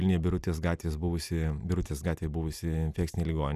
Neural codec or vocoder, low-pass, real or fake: none; 14.4 kHz; real